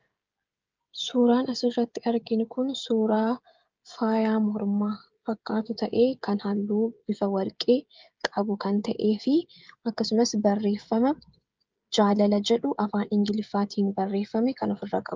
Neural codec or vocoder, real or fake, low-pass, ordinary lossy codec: codec, 16 kHz, 16 kbps, FreqCodec, smaller model; fake; 7.2 kHz; Opus, 32 kbps